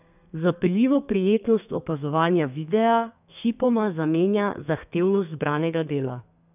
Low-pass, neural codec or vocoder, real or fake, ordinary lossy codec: 3.6 kHz; codec, 32 kHz, 1.9 kbps, SNAC; fake; none